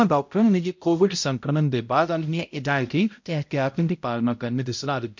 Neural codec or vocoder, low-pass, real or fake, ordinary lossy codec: codec, 16 kHz, 0.5 kbps, X-Codec, HuBERT features, trained on balanced general audio; 7.2 kHz; fake; MP3, 48 kbps